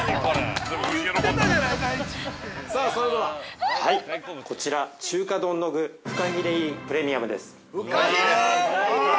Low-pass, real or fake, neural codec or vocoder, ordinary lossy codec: none; real; none; none